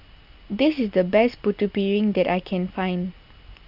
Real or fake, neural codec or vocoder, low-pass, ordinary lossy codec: real; none; 5.4 kHz; none